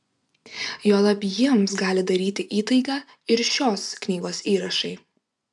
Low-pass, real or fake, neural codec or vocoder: 10.8 kHz; fake; vocoder, 24 kHz, 100 mel bands, Vocos